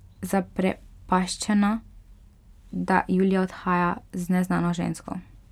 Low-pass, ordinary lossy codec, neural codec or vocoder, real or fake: 19.8 kHz; none; none; real